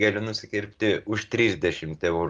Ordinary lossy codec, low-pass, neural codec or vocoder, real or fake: Opus, 32 kbps; 9.9 kHz; none; real